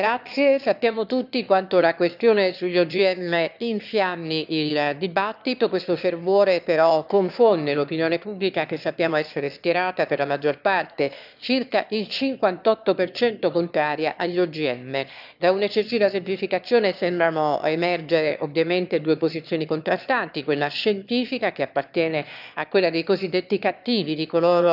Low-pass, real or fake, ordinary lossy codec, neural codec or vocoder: 5.4 kHz; fake; none; autoencoder, 22.05 kHz, a latent of 192 numbers a frame, VITS, trained on one speaker